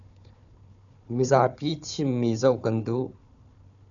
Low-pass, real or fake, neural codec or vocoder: 7.2 kHz; fake; codec, 16 kHz, 4 kbps, FunCodec, trained on Chinese and English, 50 frames a second